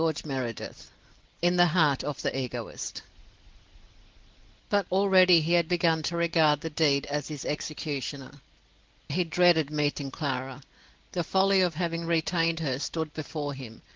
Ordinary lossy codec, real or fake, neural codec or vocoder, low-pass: Opus, 16 kbps; real; none; 7.2 kHz